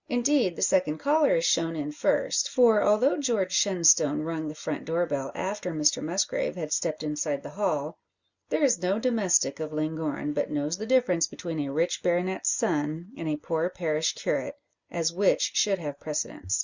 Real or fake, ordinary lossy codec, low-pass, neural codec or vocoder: real; Opus, 64 kbps; 7.2 kHz; none